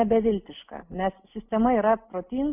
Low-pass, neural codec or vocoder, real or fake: 3.6 kHz; none; real